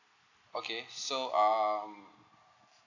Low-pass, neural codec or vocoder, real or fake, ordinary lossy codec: 7.2 kHz; none; real; none